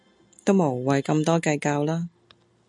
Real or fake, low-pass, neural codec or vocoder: real; 10.8 kHz; none